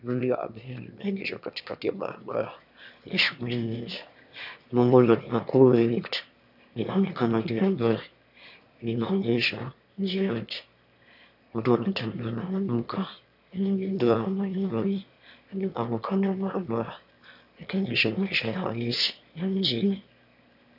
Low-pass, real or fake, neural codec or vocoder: 5.4 kHz; fake; autoencoder, 22.05 kHz, a latent of 192 numbers a frame, VITS, trained on one speaker